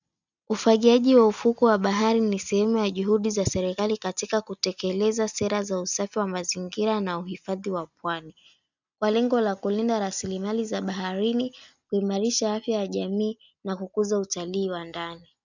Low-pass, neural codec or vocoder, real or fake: 7.2 kHz; none; real